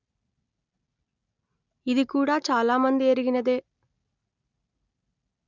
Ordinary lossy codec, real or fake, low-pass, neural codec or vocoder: none; real; 7.2 kHz; none